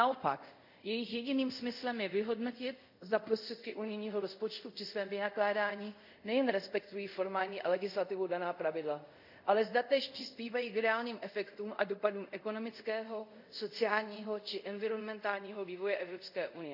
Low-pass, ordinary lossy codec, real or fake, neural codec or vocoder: 5.4 kHz; none; fake; codec, 24 kHz, 0.5 kbps, DualCodec